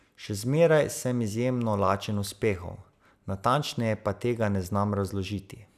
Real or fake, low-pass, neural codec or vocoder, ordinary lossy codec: real; 14.4 kHz; none; none